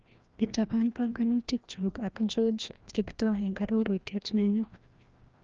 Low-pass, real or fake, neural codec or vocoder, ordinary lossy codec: 7.2 kHz; fake; codec, 16 kHz, 1 kbps, FreqCodec, larger model; Opus, 24 kbps